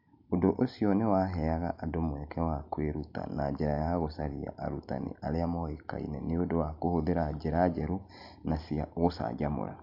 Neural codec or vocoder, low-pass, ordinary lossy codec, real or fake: none; 5.4 kHz; AAC, 48 kbps; real